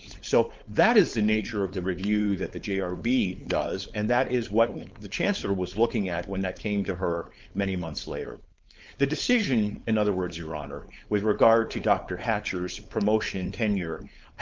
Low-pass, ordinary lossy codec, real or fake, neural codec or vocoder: 7.2 kHz; Opus, 24 kbps; fake; codec, 16 kHz, 4.8 kbps, FACodec